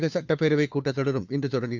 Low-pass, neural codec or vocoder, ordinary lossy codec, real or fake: 7.2 kHz; codec, 16 kHz, 2 kbps, FunCodec, trained on Chinese and English, 25 frames a second; none; fake